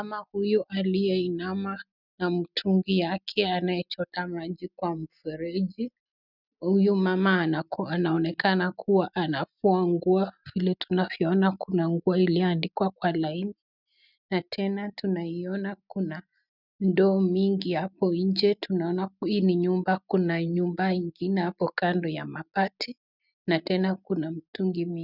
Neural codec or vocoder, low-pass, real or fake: none; 5.4 kHz; real